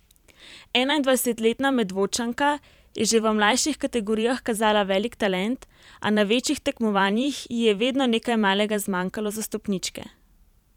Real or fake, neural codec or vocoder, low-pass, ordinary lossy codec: fake; vocoder, 44.1 kHz, 128 mel bands every 512 samples, BigVGAN v2; 19.8 kHz; none